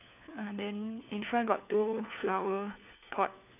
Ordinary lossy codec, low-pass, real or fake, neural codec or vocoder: none; 3.6 kHz; fake; codec, 16 kHz, 4 kbps, FunCodec, trained on LibriTTS, 50 frames a second